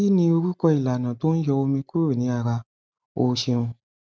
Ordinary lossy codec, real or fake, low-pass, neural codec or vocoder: none; real; none; none